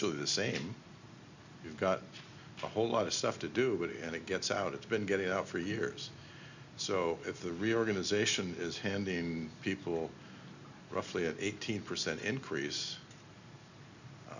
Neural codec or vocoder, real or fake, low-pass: none; real; 7.2 kHz